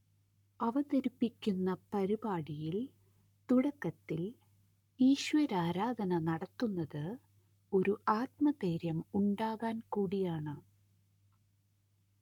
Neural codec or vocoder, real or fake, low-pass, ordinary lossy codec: codec, 44.1 kHz, 7.8 kbps, Pupu-Codec; fake; 19.8 kHz; none